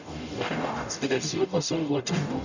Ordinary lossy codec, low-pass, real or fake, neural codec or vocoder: none; 7.2 kHz; fake; codec, 44.1 kHz, 0.9 kbps, DAC